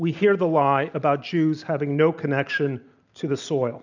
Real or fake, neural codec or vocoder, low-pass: real; none; 7.2 kHz